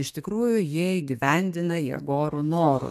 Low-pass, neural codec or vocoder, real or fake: 14.4 kHz; codec, 32 kHz, 1.9 kbps, SNAC; fake